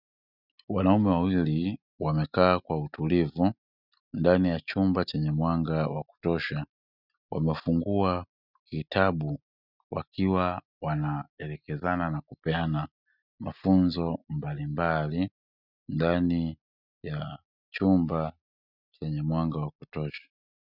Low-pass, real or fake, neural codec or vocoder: 5.4 kHz; real; none